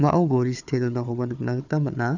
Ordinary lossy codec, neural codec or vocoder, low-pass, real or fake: none; codec, 16 kHz, 4 kbps, FunCodec, trained on Chinese and English, 50 frames a second; 7.2 kHz; fake